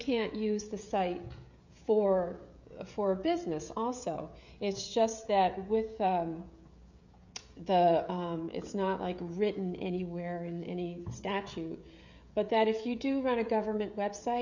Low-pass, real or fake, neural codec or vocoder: 7.2 kHz; fake; codec, 16 kHz, 16 kbps, FreqCodec, smaller model